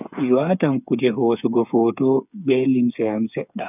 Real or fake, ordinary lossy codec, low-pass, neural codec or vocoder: fake; none; 3.6 kHz; codec, 16 kHz, 8 kbps, FreqCodec, smaller model